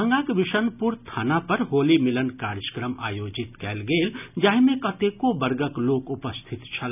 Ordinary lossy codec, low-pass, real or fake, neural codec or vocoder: none; 3.6 kHz; real; none